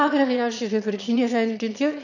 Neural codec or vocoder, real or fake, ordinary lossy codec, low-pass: autoencoder, 22.05 kHz, a latent of 192 numbers a frame, VITS, trained on one speaker; fake; none; 7.2 kHz